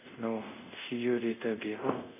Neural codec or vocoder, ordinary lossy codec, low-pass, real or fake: codec, 24 kHz, 0.5 kbps, DualCodec; none; 3.6 kHz; fake